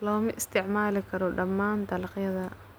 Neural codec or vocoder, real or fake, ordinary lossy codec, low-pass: none; real; none; none